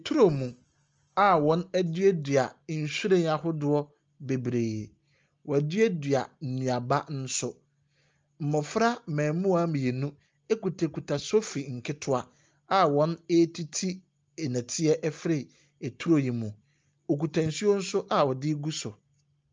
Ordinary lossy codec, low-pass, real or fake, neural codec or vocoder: Opus, 24 kbps; 7.2 kHz; real; none